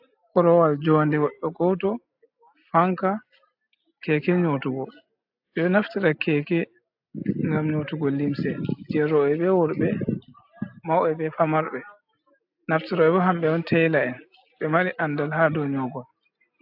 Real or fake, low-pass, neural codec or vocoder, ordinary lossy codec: real; 5.4 kHz; none; AAC, 48 kbps